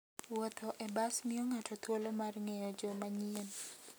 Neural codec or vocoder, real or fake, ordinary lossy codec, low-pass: none; real; none; none